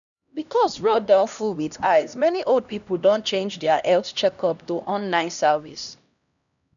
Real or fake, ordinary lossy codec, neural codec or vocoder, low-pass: fake; none; codec, 16 kHz, 1 kbps, X-Codec, HuBERT features, trained on LibriSpeech; 7.2 kHz